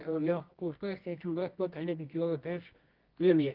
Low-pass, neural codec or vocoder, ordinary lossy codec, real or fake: 5.4 kHz; codec, 24 kHz, 0.9 kbps, WavTokenizer, medium music audio release; Opus, 24 kbps; fake